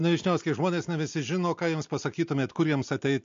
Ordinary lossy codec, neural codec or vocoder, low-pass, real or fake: MP3, 48 kbps; none; 7.2 kHz; real